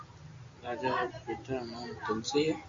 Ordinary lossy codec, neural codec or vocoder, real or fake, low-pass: MP3, 48 kbps; none; real; 7.2 kHz